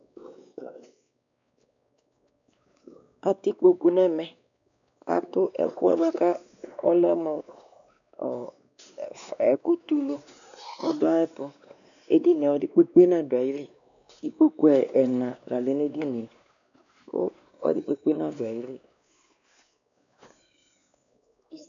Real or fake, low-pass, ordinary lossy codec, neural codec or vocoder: fake; 7.2 kHz; MP3, 96 kbps; codec, 16 kHz, 2 kbps, X-Codec, WavLM features, trained on Multilingual LibriSpeech